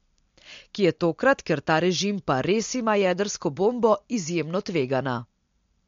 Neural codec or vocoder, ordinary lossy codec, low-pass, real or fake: none; MP3, 48 kbps; 7.2 kHz; real